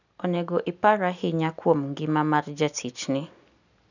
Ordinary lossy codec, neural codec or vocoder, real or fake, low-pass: none; none; real; 7.2 kHz